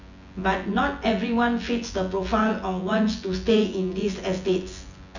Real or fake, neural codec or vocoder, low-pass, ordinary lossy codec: fake; vocoder, 24 kHz, 100 mel bands, Vocos; 7.2 kHz; none